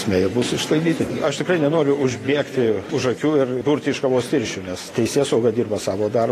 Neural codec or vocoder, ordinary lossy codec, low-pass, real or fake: vocoder, 44.1 kHz, 128 mel bands every 512 samples, BigVGAN v2; AAC, 48 kbps; 14.4 kHz; fake